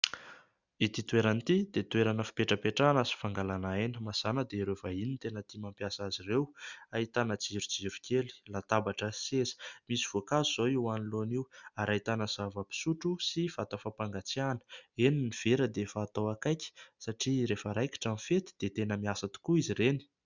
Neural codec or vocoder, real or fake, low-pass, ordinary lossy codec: none; real; 7.2 kHz; Opus, 64 kbps